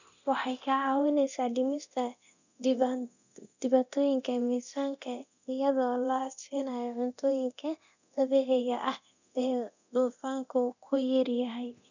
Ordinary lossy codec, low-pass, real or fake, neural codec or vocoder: none; 7.2 kHz; fake; codec, 24 kHz, 0.9 kbps, DualCodec